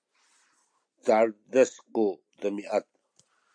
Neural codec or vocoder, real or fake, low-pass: none; real; 9.9 kHz